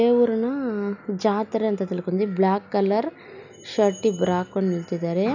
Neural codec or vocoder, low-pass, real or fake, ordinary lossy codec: none; 7.2 kHz; real; none